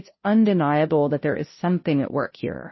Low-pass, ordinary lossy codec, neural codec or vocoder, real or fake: 7.2 kHz; MP3, 24 kbps; codec, 16 kHz, 0.5 kbps, FunCodec, trained on Chinese and English, 25 frames a second; fake